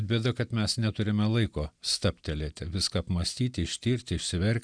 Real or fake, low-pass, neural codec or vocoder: real; 9.9 kHz; none